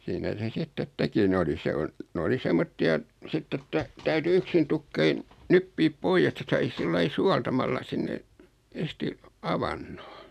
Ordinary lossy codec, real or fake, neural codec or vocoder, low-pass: none; real; none; 14.4 kHz